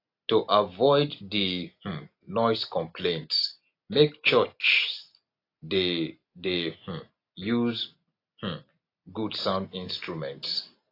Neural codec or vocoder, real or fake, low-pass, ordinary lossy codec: none; real; 5.4 kHz; AAC, 32 kbps